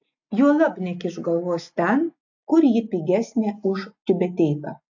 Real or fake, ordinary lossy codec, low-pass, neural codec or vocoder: real; AAC, 48 kbps; 7.2 kHz; none